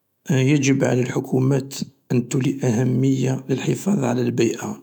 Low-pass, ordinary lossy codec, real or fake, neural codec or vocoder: 19.8 kHz; none; fake; autoencoder, 48 kHz, 128 numbers a frame, DAC-VAE, trained on Japanese speech